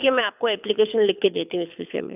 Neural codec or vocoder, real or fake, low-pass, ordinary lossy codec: codec, 24 kHz, 6 kbps, HILCodec; fake; 3.6 kHz; none